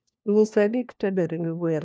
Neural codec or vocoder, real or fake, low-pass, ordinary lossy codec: codec, 16 kHz, 1 kbps, FunCodec, trained on LibriTTS, 50 frames a second; fake; none; none